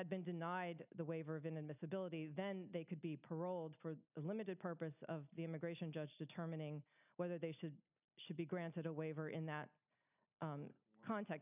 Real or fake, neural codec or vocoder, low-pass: real; none; 3.6 kHz